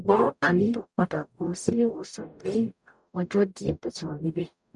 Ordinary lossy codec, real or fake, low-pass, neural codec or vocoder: none; fake; 10.8 kHz; codec, 44.1 kHz, 0.9 kbps, DAC